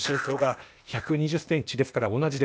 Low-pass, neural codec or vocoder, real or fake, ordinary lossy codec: none; codec, 16 kHz, 0.8 kbps, ZipCodec; fake; none